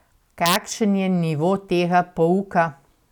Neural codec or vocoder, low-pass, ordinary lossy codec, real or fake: none; 19.8 kHz; none; real